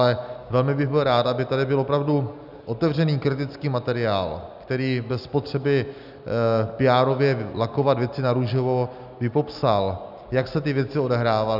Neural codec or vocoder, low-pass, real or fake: none; 5.4 kHz; real